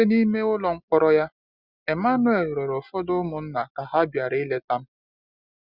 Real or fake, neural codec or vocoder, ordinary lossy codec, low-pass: real; none; Opus, 64 kbps; 5.4 kHz